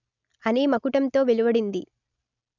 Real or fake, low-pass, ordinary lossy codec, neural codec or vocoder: real; 7.2 kHz; none; none